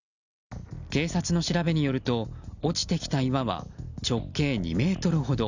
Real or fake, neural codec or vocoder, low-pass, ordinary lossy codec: real; none; 7.2 kHz; none